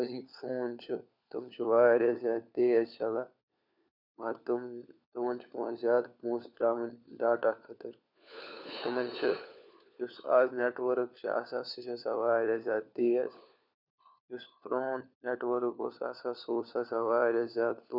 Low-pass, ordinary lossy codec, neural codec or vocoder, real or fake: 5.4 kHz; none; codec, 16 kHz, 4 kbps, FunCodec, trained on LibriTTS, 50 frames a second; fake